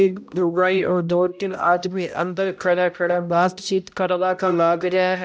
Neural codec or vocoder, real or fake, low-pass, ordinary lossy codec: codec, 16 kHz, 0.5 kbps, X-Codec, HuBERT features, trained on balanced general audio; fake; none; none